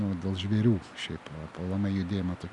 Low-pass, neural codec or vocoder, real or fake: 10.8 kHz; none; real